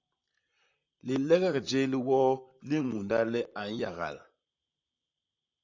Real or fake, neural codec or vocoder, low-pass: fake; vocoder, 44.1 kHz, 128 mel bands, Pupu-Vocoder; 7.2 kHz